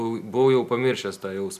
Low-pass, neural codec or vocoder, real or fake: 14.4 kHz; none; real